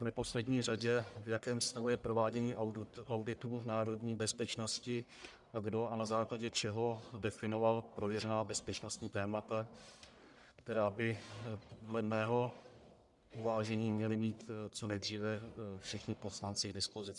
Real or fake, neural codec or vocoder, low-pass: fake; codec, 44.1 kHz, 1.7 kbps, Pupu-Codec; 10.8 kHz